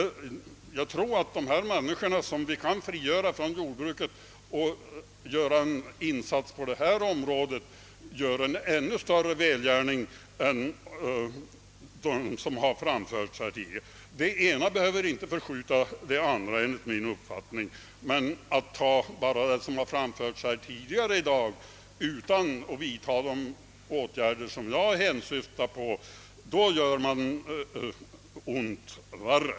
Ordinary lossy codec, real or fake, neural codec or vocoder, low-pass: none; real; none; none